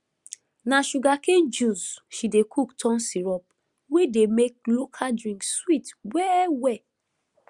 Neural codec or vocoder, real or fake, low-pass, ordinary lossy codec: none; real; 10.8 kHz; Opus, 64 kbps